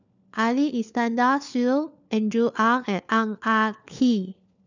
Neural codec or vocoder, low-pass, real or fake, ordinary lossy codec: codec, 16 kHz, 4 kbps, FunCodec, trained on LibriTTS, 50 frames a second; 7.2 kHz; fake; none